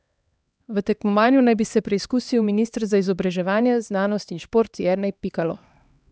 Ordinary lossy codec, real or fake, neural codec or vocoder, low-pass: none; fake; codec, 16 kHz, 2 kbps, X-Codec, HuBERT features, trained on LibriSpeech; none